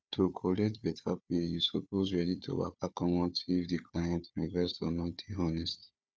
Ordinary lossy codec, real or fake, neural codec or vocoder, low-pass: none; fake; codec, 16 kHz, 4 kbps, FunCodec, trained on Chinese and English, 50 frames a second; none